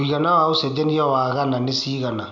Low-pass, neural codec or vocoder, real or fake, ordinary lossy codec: 7.2 kHz; none; real; none